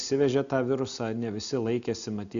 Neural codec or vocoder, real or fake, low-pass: none; real; 7.2 kHz